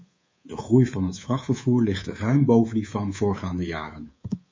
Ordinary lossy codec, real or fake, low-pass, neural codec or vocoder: MP3, 32 kbps; fake; 7.2 kHz; codec, 24 kHz, 3.1 kbps, DualCodec